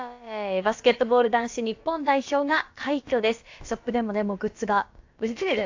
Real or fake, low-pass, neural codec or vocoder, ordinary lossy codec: fake; 7.2 kHz; codec, 16 kHz, about 1 kbps, DyCAST, with the encoder's durations; AAC, 48 kbps